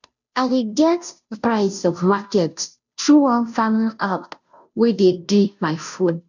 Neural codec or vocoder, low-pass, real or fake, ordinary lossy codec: codec, 16 kHz, 0.5 kbps, FunCodec, trained on Chinese and English, 25 frames a second; 7.2 kHz; fake; none